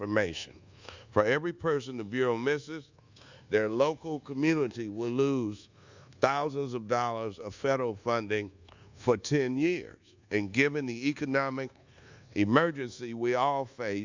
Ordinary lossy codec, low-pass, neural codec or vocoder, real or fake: Opus, 64 kbps; 7.2 kHz; codec, 24 kHz, 1.2 kbps, DualCodec; fake